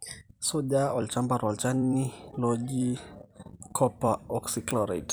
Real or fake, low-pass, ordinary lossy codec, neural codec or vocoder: fake; none; none; vocoder, 44.1 kHz, 128 mel bands every 256 samples, BigVGAN v2